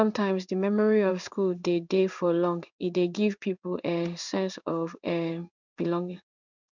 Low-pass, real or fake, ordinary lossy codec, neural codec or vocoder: 7.2 kHz; fake; none; codec, 16 kHz in and 24 kHz out, 1 kbps, XY-Tokenizer